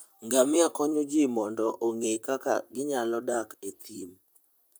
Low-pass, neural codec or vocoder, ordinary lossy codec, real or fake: none; vocoder, 44.1 kHz, 128 mel bands, Pupu-Vocoder; none; fake